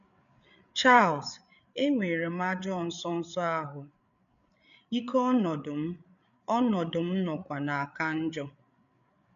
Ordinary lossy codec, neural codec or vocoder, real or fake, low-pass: none; codec, 16 kHz, 8 kbps, FreqCodec, larger model; fake; 7.2 kHz